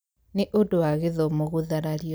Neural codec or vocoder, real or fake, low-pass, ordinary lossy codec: none; real; none; none